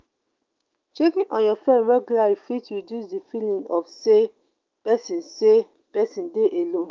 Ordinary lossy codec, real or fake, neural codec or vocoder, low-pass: Opus, 32 kbps; fake; autoencoder, 48 kHz, 128 numbers a frame, DAC-VAE, trained on Japanese speech; 7.2 kHz